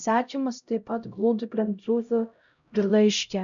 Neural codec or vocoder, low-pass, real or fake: codec, 16 kHz, 0.5 kbps, X-Codec, HuBERT features, trained on LibriSpeech; 7.2 kHz; fake